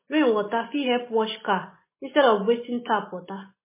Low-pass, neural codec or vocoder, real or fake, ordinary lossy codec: 3.6 kHz; none; real; MP3, 16 kbps